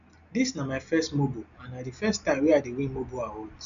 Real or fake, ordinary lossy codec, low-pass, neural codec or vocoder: real; none; 7.2 kHz; none